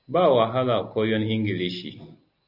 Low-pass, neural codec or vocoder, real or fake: 5.4 kHz; none; real